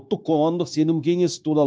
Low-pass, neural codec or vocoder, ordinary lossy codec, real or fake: none; codec, 16 kHz, 0.9 kbps, LongCat-Audio-Codec; none; fake